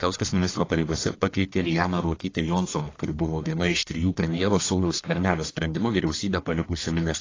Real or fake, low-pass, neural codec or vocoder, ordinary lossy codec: fake; 7.2 kHz; codec, 44.1 kHz, 1.7 kbps, Pupu-Codec; AAC, 32 kbps